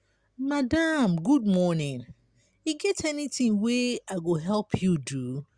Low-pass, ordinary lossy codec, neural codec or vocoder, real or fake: 9.9 kHz; Opus, 64 kbps; none; real